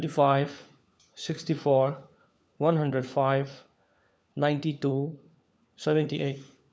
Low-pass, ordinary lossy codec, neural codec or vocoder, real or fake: none; none; codec, 16 kHz, 4 kbps, FunCodec, trained on LibriTTS, 50 frames a second; fake